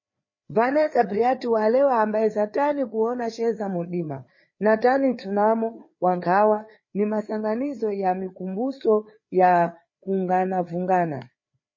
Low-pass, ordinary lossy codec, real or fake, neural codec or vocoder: 7.2 kHz; MP3, 32 kbps; fake; codec, 16 kHz, 4 kbps, FreqCodec, larger model